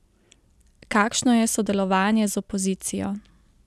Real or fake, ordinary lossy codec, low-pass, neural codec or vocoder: real; none; none; none